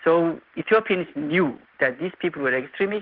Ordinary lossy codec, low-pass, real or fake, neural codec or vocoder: Opus, 16 kbps; 5.4 kHz; real; none